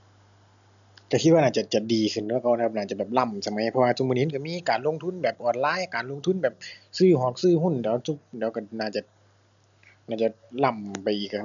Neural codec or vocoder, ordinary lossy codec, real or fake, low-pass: none; none; real; 7.2 kHz